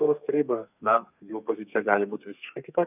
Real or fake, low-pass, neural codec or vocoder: fake; 3.6 kHz; codec, 32 kHz, 1.9 kbps, SNAC